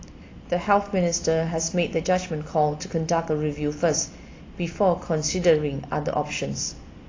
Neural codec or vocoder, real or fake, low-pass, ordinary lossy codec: none; real; 7.2 kHz; AAC, 32 kbps